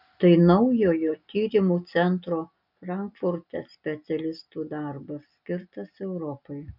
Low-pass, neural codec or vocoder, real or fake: 5.4 kHz; none; real